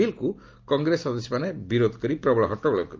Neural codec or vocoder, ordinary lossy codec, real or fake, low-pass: none; Opus, 24 kbps; real; 7.2 kHz